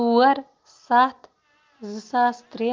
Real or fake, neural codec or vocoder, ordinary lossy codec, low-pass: real; none; Opus, 32 kbps; 7.2 kHz